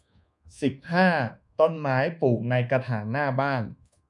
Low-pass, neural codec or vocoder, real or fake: 10.8 kHz; codec, 24 kHz, 1.2 kbps, DualCodec; fake